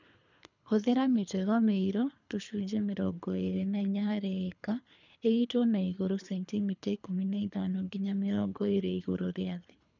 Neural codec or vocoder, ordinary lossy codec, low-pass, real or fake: codec, 24 kHz, 3 kbps, HILCodec; none; 7.2 kHz; fake